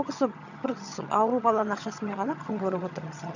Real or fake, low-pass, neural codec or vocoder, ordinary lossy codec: fake; 7.2 kHz; vocoder, 22.05 kHz, 80 mel bands, HiFi-GAN; none